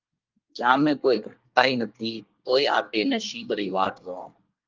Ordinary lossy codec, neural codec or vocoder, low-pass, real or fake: Opus, 32 kbps; codec, 24 kHz, 1 kbps, SNAC; 7.2 kHz; fake